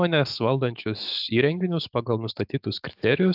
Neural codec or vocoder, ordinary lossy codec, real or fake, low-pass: autoencoder, 48 kHz, 128 numbers a frame, DAC-VAE, trained on Japanese speech; AAC, 48 kbps; fake; 5.4 kHz